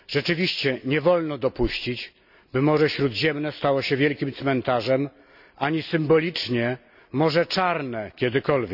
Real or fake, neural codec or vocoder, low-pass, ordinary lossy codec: real; none; 5.4 kHz; none